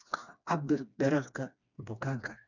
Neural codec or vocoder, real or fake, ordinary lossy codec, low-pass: codec, 16 kHz, 2 kbps, FreqCodec, smaller model; fake; none; 7.2 kHz